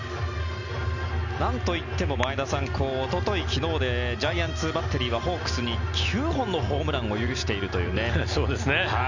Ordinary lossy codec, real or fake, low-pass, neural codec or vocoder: none; real; 7.2 kHz; none